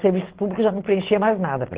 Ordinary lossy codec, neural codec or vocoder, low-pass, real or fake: Opus, 16 kbps; none; 3.6 kHz; real